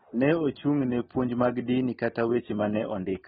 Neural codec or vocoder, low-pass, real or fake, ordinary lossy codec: none; 19.8 kHz; real; AAC, 16 kbps